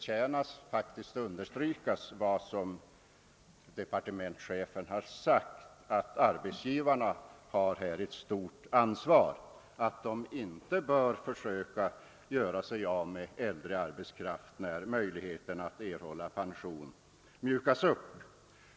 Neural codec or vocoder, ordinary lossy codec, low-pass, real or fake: none; none; none; real